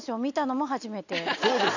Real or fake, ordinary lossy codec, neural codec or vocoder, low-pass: real; none; none; 7.2 kHz